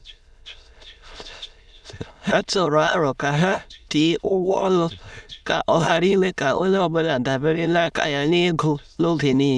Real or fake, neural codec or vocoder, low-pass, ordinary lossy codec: fake; autoencoder, 22.05 kHz, a latent of 192 numbers a frame, VITS, trained on many speakers; none; none